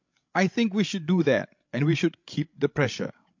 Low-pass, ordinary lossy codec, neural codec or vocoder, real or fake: 7.2 kHz; MP3, 48 kbps; codec, 16 kHz, 16 kbps, FreqCodec, larger model; fake